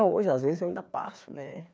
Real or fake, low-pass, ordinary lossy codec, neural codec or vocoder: fake; none; none; codec, 16 kHz, 2 kbps, FreqCodec, larger model